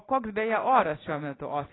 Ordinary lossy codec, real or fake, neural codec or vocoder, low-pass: AAC, 16 kbps; real; none; 7.2 kHz